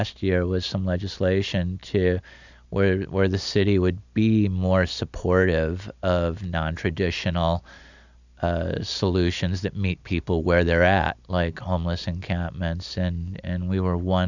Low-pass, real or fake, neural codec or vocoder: 7.2 kHz; real; none